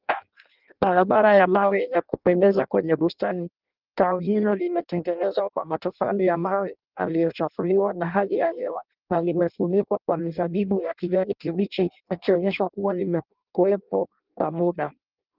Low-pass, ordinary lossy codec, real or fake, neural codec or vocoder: 5.4 kHz; Opus, 32 kbps; fake; codec, 16 kHz in and 24 kHz out, 0.6 kbps, FireRedTTS-2 codec